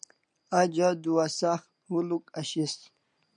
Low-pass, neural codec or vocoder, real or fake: 9.9 kHz; none; real